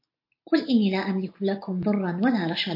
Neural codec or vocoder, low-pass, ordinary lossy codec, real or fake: none; 5.4 kHz; MP3, 32 kbps; real